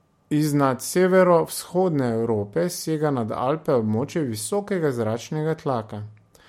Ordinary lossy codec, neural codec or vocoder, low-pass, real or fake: MP3, 64 kbps; none; 19.8 kHz; real